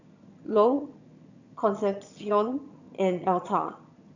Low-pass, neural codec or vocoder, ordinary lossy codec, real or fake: 7.2 kHz; vocoder, 22.05 kHz, 80 mel bands, HiFi-GAN; none; fake